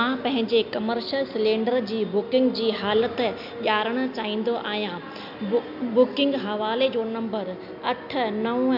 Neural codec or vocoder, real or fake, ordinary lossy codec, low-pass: none; real; MP3, 48 kbps; 5.4 kHz